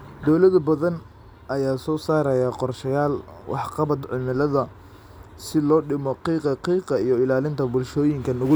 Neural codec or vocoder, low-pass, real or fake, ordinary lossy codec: none; none; real; none